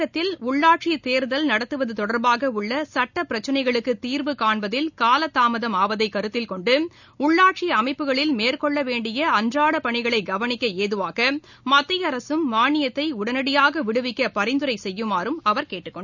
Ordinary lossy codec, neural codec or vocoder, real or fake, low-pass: none; none; real; 7.2 kHz